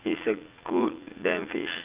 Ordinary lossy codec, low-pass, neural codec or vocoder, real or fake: Opus, 32 kbps; 3.6 kHz; vocoder, 44.1 kHz, 80 mel bands, Vocos; fake